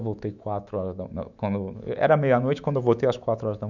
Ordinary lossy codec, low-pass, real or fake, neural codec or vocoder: none; 7.2 kHz; fake; autoencoder, 48 kHz, 128 numbers a frame, DAC-VAE, trained on Japanese speech